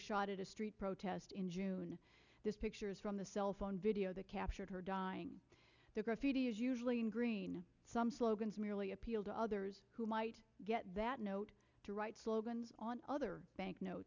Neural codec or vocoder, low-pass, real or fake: none; 7.2 kHz; real